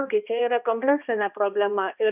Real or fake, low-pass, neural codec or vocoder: fake; 3.6 kHz; codec, 16 kHz, 1 kbps, X-Codec, HuBERT features, trained on balanced general audio